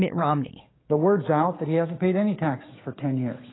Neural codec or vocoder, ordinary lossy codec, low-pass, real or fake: codec, 16 kHz, 6 kbps, DAC; AAC, 16 kbps; 7.2 kHz; fake